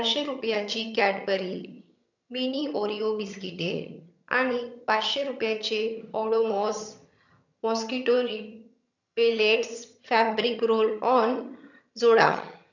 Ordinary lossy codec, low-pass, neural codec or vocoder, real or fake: none; 7.2 kHz; vocoder, 22.05 kHz, 80 mel bands, HiFi-GAN; fake